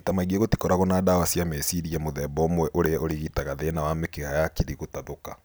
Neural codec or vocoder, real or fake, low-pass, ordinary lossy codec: none; real; none; none